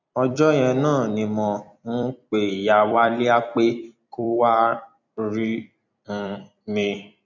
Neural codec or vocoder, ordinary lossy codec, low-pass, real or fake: vocoder, 44.1 kHz, 128 mel bands every 256 samples, BigVGAN v2; none; 7.2 kHz; fake